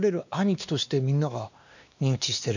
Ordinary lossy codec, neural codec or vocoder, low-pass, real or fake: none; codec, 16 kHz, 2 kbps, X-Codec, WavLM features, trained on Multilingual LibriSpeech; 7.2 kHz; fake